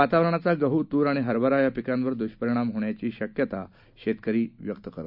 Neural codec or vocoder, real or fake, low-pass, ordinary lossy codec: none; real; 5.4 kHz; none